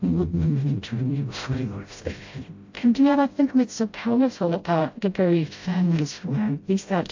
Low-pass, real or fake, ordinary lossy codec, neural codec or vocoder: 7.2 kHz; fake; AAC, 48 kbps; codec, 16 kHz, 0.5 kbps, FreqCodec, smaller model